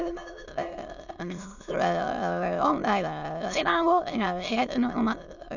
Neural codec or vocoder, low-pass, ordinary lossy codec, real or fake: autoencoder, 22.05 kHz, a latent of 192 numbers a frame, VITS, trained on many speakers; 7.2 kHz; none; fake